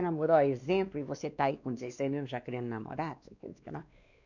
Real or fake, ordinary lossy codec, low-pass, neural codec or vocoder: fake; none; 7.2 kHz; codec, 16 kHz, 2 kbps, X-Codec, WavLM features, trained on Multilingual LibriSpeech